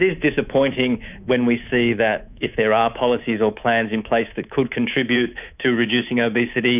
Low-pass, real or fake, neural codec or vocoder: 3.6 kHz; fake; codec, 24 kHz, 3.1 kbps, DualCodec